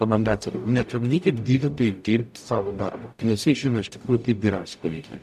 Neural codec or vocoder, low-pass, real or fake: codec, 44.1 kHz, 0.9 kbps, DAC; 14.4 kHz; fake